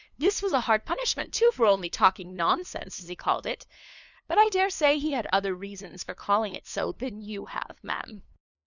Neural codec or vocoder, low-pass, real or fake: codec, 16 kHz, 2 kbps, FunCodec, trained on LibriTTS, 25 frames a second; 7.2 kHz; fake